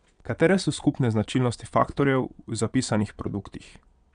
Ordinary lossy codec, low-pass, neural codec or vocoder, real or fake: none; 9.9 kHz; vocoder, 22.05 kHz, 80 mel bands, Vocos; fake